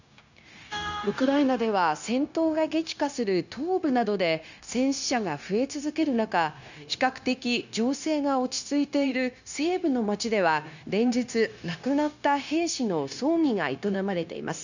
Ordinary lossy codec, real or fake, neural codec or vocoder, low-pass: none; fake; codec, 16 kHz, 0.9 kbps, LongCat-Audio-Codec; 7.2 kHz